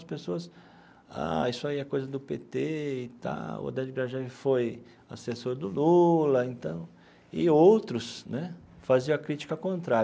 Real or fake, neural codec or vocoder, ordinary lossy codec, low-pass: real; none; none; none